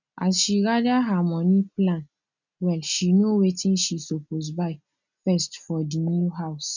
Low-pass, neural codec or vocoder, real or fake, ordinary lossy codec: 7.2 kHz; none; real; none